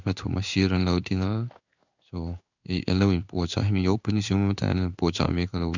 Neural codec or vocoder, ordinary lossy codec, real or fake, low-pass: codec, 16 kHz in and 24 kHz out, 1 kbps, XY-Tokenizer; none; fake; 7.2 kHz